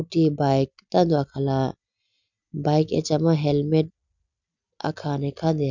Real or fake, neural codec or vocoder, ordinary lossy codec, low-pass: real; none; none; 7.2 kHz